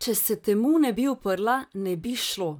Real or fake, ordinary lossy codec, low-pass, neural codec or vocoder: fake; none; none; vocoder, 44.1 kHz, 128 mel bands, Pupu-Vocoder